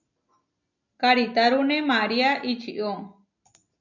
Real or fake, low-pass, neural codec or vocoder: real; 7.2 kHz; none